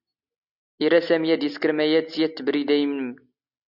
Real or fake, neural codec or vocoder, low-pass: real; none; 5.4 kHz